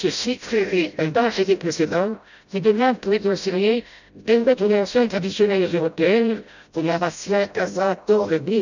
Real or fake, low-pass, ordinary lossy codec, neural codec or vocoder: fake; 7.2 kHz; none; codec, 16 kHz, 0.5 kbps, FreqCodec, smaller model